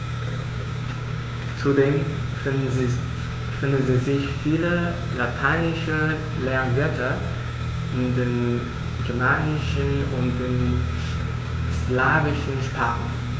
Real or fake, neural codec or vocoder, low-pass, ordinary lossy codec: fake; codec, 16 kHz, 6 kbps, DAC; none; none